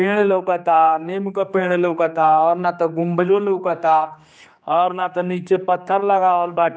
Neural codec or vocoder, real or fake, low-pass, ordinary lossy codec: codec, 16 kHz, 2 kbps, X-Codec, HuBERT features, trained on general audio; fake; none; none